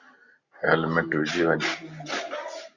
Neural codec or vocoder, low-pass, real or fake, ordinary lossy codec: none; 7.2 kHz; real; Opus, 64 kbps